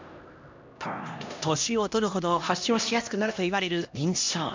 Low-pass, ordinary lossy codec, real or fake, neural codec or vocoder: 7.2 kHz; MP3, 64 kbps; fake; codec, 16 kHz, 1 kbps, X-Codec, HuBERT features, trained on LibriSpeech